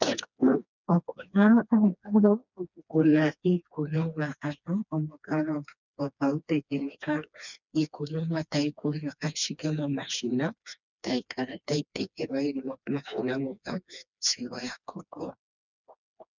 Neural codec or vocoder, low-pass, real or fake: codec, 16 kHz, 2 kbps, FreqCodec, smaller model; 7.2 kHz; fake